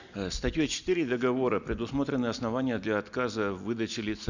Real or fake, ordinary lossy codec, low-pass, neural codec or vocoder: real; none; 7.2 kHz; none